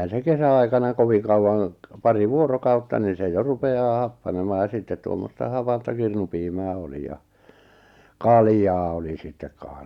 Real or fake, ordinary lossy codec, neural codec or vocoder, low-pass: real; Opus, 64 kbps; none; 19.8 kHz